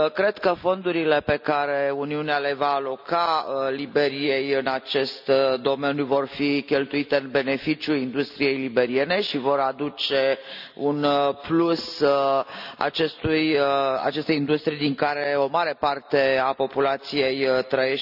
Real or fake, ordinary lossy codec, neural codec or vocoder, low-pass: real; none; none; 5.4 kHz